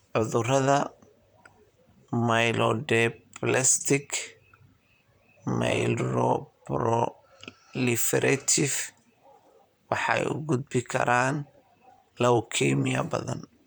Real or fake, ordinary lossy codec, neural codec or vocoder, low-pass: fake; none; vocoder, 44.1 kHz, 128 mel bands, Pupu-Vocoder; none